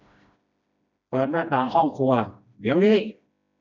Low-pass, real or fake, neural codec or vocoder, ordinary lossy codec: 7.2 kHz; fake; codec, 16 kHz, 1 kbps, FreqCodec, smaller model; none